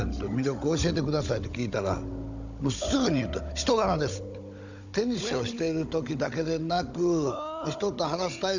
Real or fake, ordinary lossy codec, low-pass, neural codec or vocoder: fake; none; 7.2 kHz; codec, 16 kHz, 16 kbps, FunCodec, trained on Chinese and English, 50 frames a second